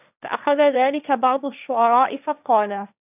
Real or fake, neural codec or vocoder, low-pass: fake; codec, 16 kHz, 1.1 kbps, Voila-Tokenizer; 3.6 kHz